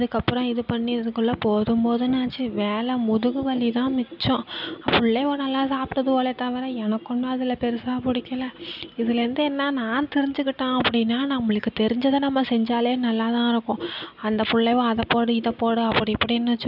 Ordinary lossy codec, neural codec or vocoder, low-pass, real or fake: none; none; 5.4 kHz; real